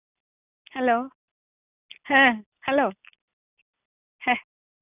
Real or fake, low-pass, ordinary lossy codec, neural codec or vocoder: real; 3.6 kHz; none; none